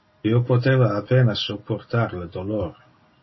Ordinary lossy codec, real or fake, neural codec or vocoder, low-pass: MP3, 24 kbps; real; none; 7.2 kHz